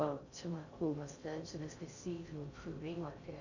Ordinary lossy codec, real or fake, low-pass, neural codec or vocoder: none; fake; 7.2 kHz; codec, 16 kHz in and 24 kHz out, 0.6 kbps, FocalCodec, streaming, 4096 codes